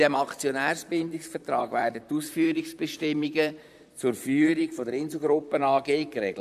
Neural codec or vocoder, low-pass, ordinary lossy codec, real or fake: vocoder, 44.1 kHz, 128 mel bands, Pupu-Vocoder; 14.4 kHz; none; fake